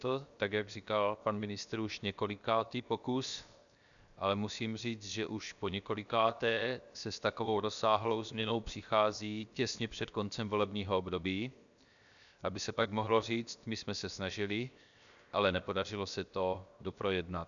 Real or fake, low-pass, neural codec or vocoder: fake; 7.2 kHz; codec, 16 kHz, 0.7 kbps, FocalCodec